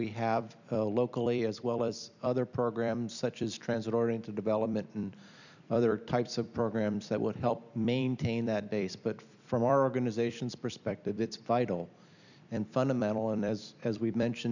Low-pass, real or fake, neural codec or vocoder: 7.2 kHz; fake; vocoder, 44.1 kHz, 128 mel bands every 256 samples, BigVGAN v2